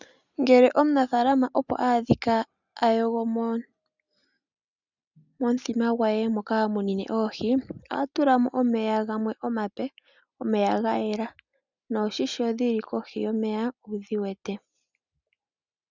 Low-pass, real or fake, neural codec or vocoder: 7.2 kHz; real; none